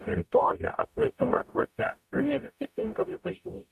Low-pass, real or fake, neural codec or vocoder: 14.4 kHz; fake; codec, 44.1 kHz, 0.9 kbps, DAC